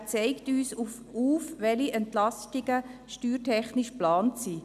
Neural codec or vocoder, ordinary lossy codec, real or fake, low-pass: none; none; real; 14.4 kHz